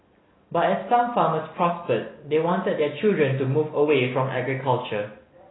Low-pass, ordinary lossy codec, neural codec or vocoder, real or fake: 7.2 kHz; AAC, 16 kbps; none; real